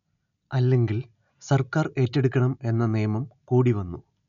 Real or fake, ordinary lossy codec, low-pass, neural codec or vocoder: real; none; 7.2 kHz; none